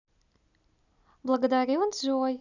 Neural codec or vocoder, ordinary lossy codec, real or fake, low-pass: none; none; real; 7.2 kHz